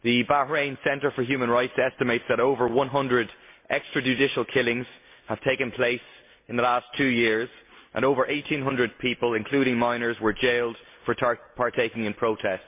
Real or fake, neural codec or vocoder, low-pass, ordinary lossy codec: real; none; 3.6 kHz; MP3, 24 kbps